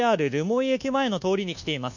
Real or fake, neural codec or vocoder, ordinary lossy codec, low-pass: fake; codec, 24 kHz, 1.2 kbps, DualCodec; none; 7.2 kHz